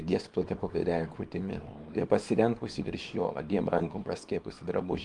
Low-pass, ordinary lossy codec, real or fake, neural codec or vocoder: 10.8 kHz; Opus, 32 kbps; fake; codec, 24 kHz, 0.9 kbps, WavTokenizer, small release